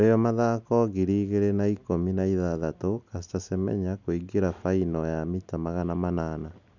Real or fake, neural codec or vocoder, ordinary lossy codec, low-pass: real; none; none; 7.2 kHz